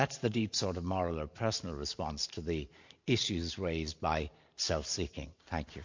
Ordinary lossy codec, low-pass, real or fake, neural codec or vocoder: MP3, 48 kbps; 7.2 kHz; fake; vocoder, 44.1 kHz, 128 mel bands every 512 samples, BigVGAN v2